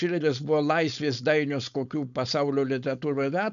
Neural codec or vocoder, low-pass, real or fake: codec, 16 kHz, 4.8 kbps, FACodec; 7.2 kHz; fake